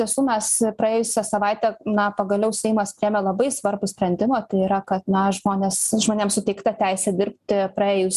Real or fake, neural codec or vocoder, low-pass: real; none; 14.4 kHz